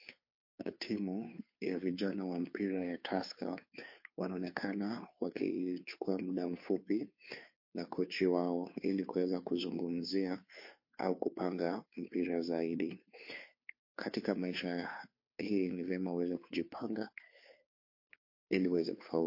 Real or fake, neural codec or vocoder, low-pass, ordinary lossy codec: fake; codec, 16 kHz, 2 kbps, FunCodec, trained on Chinese and English, 25 frames a second; 5.4 kHz; MP3, 32 kbps